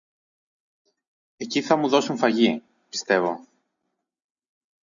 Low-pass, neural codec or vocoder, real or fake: 7.2 kHz; none; real